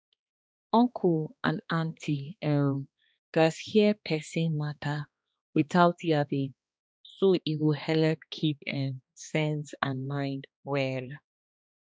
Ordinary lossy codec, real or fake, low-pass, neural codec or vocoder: none; fake; none; codec, 16 kHz, 2 kbps, X-Codec, HuBERT features, trained on balanced general audio